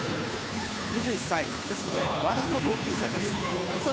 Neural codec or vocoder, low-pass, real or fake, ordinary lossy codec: codec, 16 kHz, 2 kbps, FunCodec, trained on Chinese and English, 25 frames a second; none; fake; none